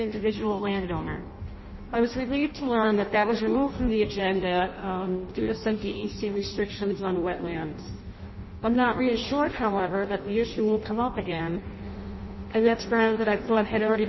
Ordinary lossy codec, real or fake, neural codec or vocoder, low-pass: MP3, 24 kbps; fake; codec, 16 kHz in and 24 kHz out, 0.6 kbps, FireRedTTS-2 codec; 7.2 kHz